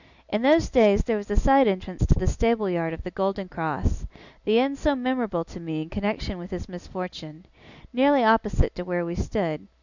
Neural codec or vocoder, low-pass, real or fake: none; 7.2 kHz; real